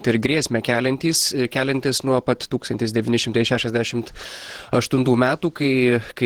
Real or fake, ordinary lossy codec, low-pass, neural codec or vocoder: fake; Opus, 16 kbps; 19.8 kHz; vocoder, 48 kHz, 128 mel bands, Vocos